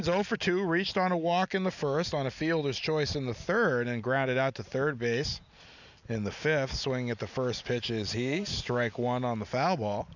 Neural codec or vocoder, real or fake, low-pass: none; real; 7.2 kHz